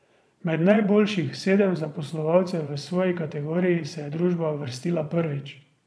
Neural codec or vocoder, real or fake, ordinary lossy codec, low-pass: vocoder, 22.05 kHz, 80 mel bands, Vocos; fake; none; none